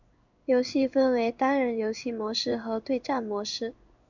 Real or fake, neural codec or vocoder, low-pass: fake; codec, 16 kHz in and 24 kHz out, 1 kbps, XY-Tokenizer; 7.2 kHz